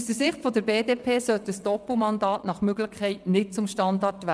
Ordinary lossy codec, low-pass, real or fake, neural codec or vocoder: none; none; fake; vocoder, 22.05 kHz, 80 mel bands, WaveNeXt